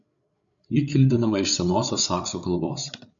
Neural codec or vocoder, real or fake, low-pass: codec, 16 kHz, 8 kbps, FreqCodec, larger model; fake; 7.2 kHz